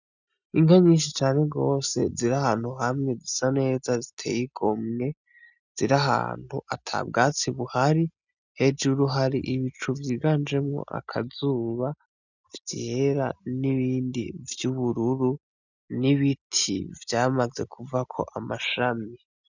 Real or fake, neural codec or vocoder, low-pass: real; none; 7.2 kHz